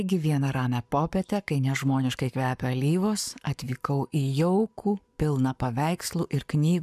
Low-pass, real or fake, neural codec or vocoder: 14.4 kHz; fake; codec, 44.1 kHz, 7.8 kbps, Pupu-Codec